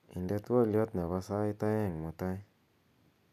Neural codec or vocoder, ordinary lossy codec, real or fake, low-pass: none; none; real; 14.4 kHz